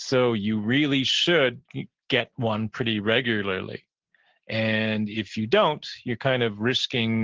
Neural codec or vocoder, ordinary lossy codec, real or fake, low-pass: none; Opus, 16 kbps; real; 7.2 kHz